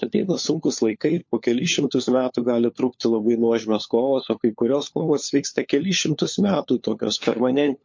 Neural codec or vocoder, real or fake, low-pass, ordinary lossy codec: codec, 16 kHz, 4 kbps, FunCodec, trained on LibriTTS, 50 frames a second; fake; 7.2 kHz; MP3, 48 kbps